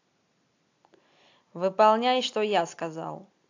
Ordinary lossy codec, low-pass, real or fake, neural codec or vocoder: AAC, 48 kbps; 7.2 kHz; real; none